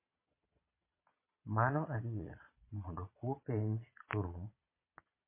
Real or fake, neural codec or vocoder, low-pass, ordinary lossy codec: fake; vocoder, 22.05 kHz, 80 mel bands, Vocos; 3.6 kHz; MP3, 24 kbps